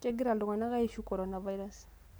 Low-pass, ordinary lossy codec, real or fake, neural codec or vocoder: none; none; real; none